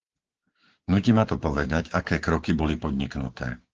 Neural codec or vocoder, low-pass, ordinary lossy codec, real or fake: codec, 16 kHz, 6 kbps, DAC; 7.2 kHz; Opus, 16 kbps; fake